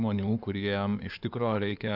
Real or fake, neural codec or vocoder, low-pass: fake; codec, 16 kHz in and 24 kHz out, 2.2 kbps, FireRedTTS-2 codec; 5.4 kHz